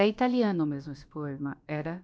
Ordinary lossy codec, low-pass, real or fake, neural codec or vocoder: none; none; fake; codec, 16 kHz, about 1 kbps, DyCAST, with the encoder's durations